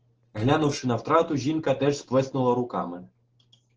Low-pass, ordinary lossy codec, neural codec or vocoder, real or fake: 7.2 kHz; Opus, 16 kbps; none; real